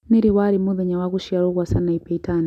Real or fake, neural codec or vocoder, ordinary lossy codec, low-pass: real; none; none; 14.4 kHz